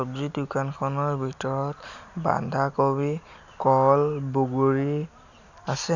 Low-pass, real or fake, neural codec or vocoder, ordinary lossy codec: 7.2 kHz; real; none; none